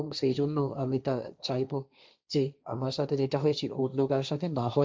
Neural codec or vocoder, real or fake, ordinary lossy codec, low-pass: codec, 16 kHz, 1.1 kbps, Voila-Tokenizer; fake; none; none